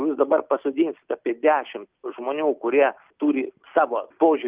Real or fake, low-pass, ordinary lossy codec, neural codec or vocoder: real; 3.6 kHz; Opus, 24 kbps; none